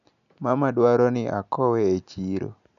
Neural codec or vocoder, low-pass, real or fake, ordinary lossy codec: none; 7.2 kHz; real; none